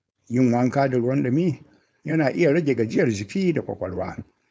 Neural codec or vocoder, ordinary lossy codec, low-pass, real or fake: codec, 16 kHz, 4.8 kbps, FACodec; none; none; fake